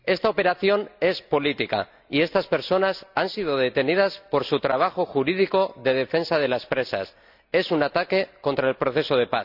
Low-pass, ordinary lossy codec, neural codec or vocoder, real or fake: 5.4 kHz; none; none; real